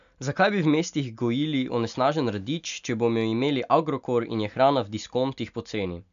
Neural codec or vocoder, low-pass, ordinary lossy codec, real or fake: none; 7.2 kHz; AAC, 96 kbps; real